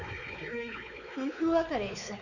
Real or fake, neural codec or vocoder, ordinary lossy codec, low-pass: fake; codec, 16 kHz, 4 kbps, X-Codec, WavLM features, trained on Multilingual LibriSpeech; none; 7.2 kHz